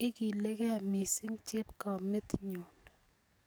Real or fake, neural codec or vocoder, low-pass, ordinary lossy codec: fake; codec, 44.1 kHz, 7.8 kbps, DAC; none; none